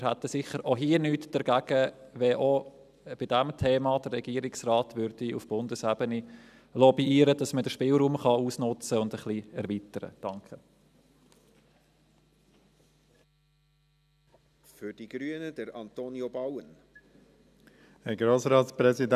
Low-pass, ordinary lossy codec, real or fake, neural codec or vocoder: 14.4 kHz; none; real; none